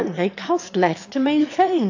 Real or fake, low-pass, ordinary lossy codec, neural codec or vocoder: fake; 7.2 kHz; AAC, 48 kbps; autoencoder, 22.05 kHz, a latent of 192 numbers a frame, VITS, trained on one speaker